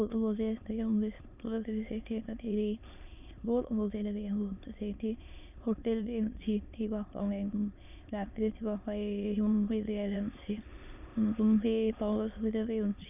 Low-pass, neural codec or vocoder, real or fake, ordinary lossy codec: 3.6 kHz; autoencoder, 22.05 kHz, a latent of 192 numbers a frame, VITS, trained on many speakers; fake; none